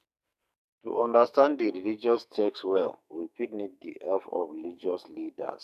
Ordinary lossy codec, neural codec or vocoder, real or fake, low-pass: none; codec, 44.1 kHz, 2.6 kbps, SNAC; fake; 14.4 kHz